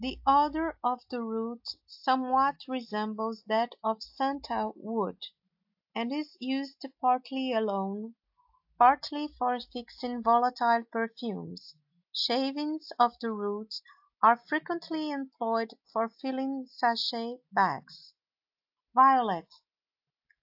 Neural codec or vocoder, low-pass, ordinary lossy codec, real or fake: none; 5.4 kHz; AAC, 48 kbps; real